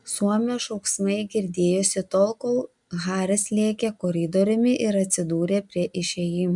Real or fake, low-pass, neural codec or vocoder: real; 10.8 kHz; none